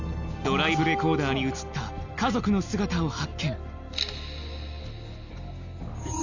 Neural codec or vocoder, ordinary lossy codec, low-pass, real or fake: none; none; 7.2 kHz; real